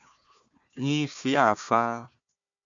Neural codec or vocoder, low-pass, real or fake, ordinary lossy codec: codec, 16 kHz, 1 kbps, FunCodec, trained on Chinese and English, 50 frames a second; 7.2 kHz; fake; AAC, 64 kbps